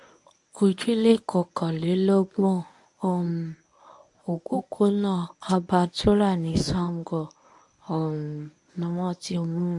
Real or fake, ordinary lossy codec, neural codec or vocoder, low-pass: fake; MP3, 64 kbps; codec, 24 kHz, 0.9 kbps, WavTokenizer, medium speech release version 1; 10.8 kHz